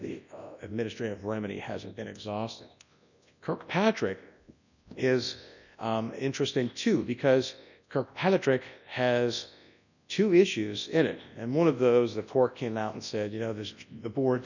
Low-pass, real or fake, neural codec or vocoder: 7.2 kHz; fake; codec, 24 kHz, 0.9 kbps, WavTokenizer, large speech release